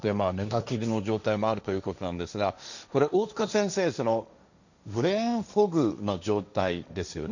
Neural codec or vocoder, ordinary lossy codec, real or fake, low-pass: codec, 16 kHz, 1.1 kbps, Voila-Tokenizer; none; fake; 7.2 kHz